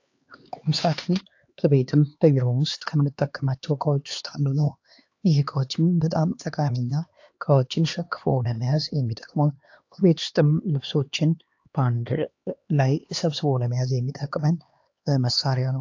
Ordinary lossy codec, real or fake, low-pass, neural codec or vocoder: AAC, 48 kbps; fake; 7.2 kHz; codec, 16 kHz, 2 kbps, X-Codec, HuBERT features, trained on LibriSpeech